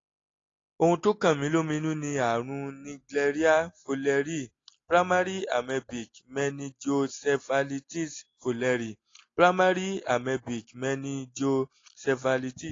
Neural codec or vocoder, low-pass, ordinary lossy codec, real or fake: none; 7.2 kHz; AAC, 32 kbps; real